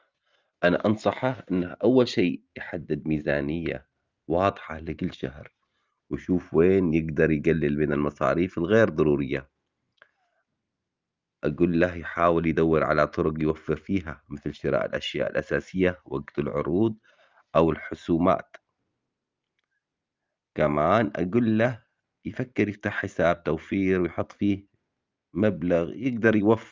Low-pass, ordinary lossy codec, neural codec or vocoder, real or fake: 7.2 kHz; Opus, 24 kbps; none; real